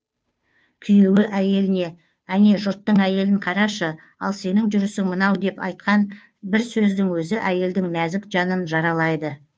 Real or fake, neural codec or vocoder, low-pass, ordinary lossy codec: fake; codec, 16 kHz, 2 kbps, FunCodec, trained on Chinese and English, 25 frames a second; none; none